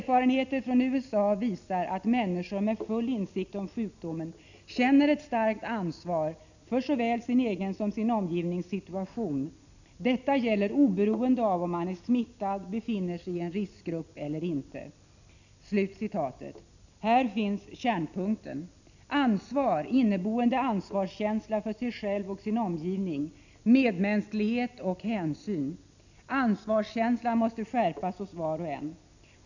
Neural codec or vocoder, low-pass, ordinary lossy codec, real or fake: none; 7.2 kHz; none; real